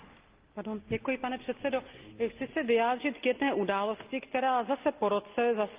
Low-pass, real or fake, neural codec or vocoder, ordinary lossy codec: 3.6 kHz; real; none; Opus, 24 kbps